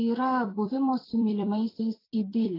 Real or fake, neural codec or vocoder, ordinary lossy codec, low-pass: fake; vocoder, 44.1 kHz, 128 mel bands every 256 samples, BigVGAN v2; AAC, 24 kbps; 5.4 kHz